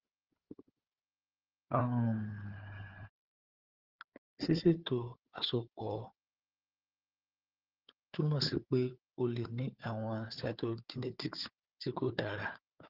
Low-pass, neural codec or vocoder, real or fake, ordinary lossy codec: 5.4 kHz; codec, 16 kHz, 4 kbps, FunCodec, trained on Chinese and English, 50 frames a second; fake; Opus, 16 kbps